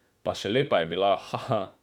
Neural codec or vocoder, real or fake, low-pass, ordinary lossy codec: autoencoder, 48 kHz, 32 numbers a frame, DAC-VAE, trained on Japanese speech; fake; 19.8 kHz; none